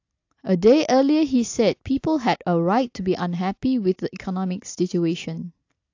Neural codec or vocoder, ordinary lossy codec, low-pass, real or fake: none; AAC, 48 kbps; 7.2 kHz; real